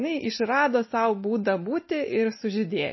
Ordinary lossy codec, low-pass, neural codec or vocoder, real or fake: MP3, 24 kbps; 7.2 kHz; none; real